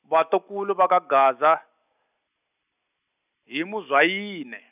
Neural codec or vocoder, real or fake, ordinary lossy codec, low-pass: none; real; none; 3.6 kHz